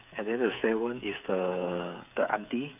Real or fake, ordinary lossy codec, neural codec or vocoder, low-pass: fake; none; codec, 16 kHz, 8 kbps, FreqCodec, smaller model; 3.6 kHz